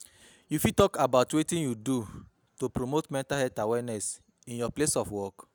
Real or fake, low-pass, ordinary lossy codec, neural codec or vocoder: real; none; none; none